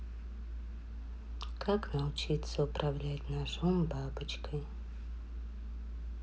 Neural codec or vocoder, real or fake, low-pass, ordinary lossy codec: none; real; none; none